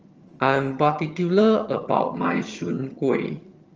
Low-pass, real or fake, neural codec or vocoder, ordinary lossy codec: 7.2 kHz; fake; vocoder, 22.05 kHz, 80 mel bands, HiFi-GAN; Opus, 24 kbps